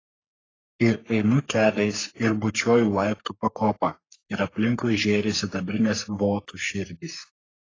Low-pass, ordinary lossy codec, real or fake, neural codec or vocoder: 7.2 kHz; AAC, 32 kbps; fake; codec, 44.1 kHz, 3.4 kbps, Pupu-Codec